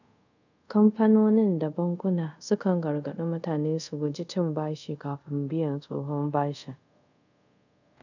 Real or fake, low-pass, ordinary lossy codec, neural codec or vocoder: fake; 7.2 kHz; none; codec, 24 kHz, 0.5 kbps, DualCodec